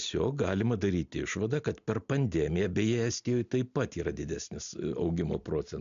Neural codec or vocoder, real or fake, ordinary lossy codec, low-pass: none; real; MP3, 64 kbps; 7.2 kHz